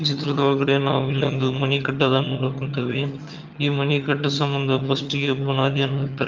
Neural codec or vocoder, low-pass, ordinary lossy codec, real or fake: vocoder, 22.05 kHz, 80 mel bands, HiFi-GAN; 7.2 kHz; Opus, 24 kbps; fake